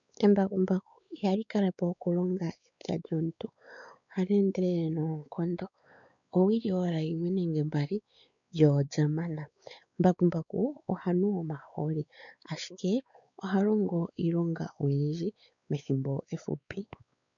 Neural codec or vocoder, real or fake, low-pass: codec, 16 kHz, 4 kbps, X-Codec, WavLM features, trained on Multilingual LibriSpeech; fake; 7.2 kHz